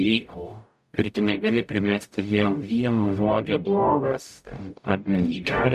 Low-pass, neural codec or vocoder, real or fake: 14.4 kHz; codec, 44.1 kHz, 0.9 kbps, DAC; fake